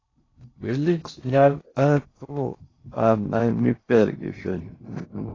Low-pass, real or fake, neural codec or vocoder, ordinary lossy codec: 7.2 kHz; fake; codec, 16 kHz in and 24 kHz out, 0.6 kbps, FocalCodec, streaming, 4096 codes; AAC, 32 kbps